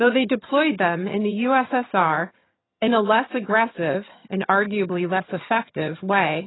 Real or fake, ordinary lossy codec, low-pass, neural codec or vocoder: fake; AAC, 16 kbps; 7.2 kHz; vocoder, 22.05 kHz, 80 mel bands, HiFi-GAN